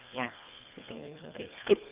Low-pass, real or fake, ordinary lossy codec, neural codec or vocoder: 3.6 kHz; fake; Opus, 24 kbps; codec, 24 kHz, 1.5 kbps, HILCodec